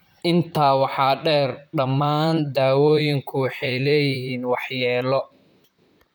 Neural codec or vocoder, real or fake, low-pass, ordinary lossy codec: vocoder, 44.1 kHz, 128 mel bands, Pupu-Vocoder; fake; none; none